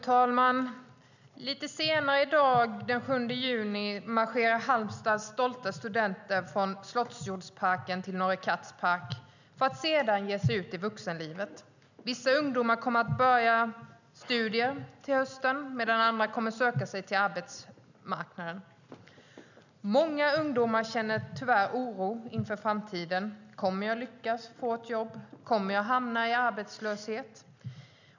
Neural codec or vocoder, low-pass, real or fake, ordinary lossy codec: none; 7.2 kHz; real; none